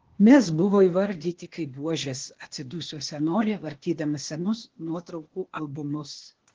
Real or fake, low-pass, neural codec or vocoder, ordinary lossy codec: fake; 7.2 kHz; codec, 16 kHz, 0.8 kbps, ZipCodec; Opus, 16 kbps